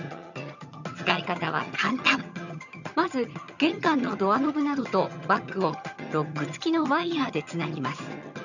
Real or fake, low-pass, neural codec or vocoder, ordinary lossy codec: fake; 7.2 kHz; vocoder, 22.05 kHz, 80 mel bands, HiFi-GAN; none